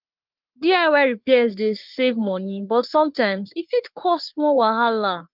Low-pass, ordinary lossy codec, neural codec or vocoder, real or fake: 5.4 kHz; Opus, 24 kbps; codec, 44.1 kHz, 3.4 kbps, Pupu-Codec; fake